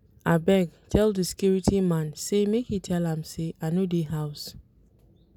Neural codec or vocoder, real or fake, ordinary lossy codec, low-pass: none; real; none; 19.8 kHz